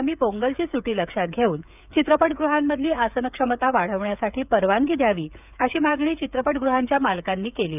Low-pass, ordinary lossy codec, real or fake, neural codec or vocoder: 3.6 kHz; none; fake; codec, 16 kHz, 8 kbps, FreqCodec, smaller model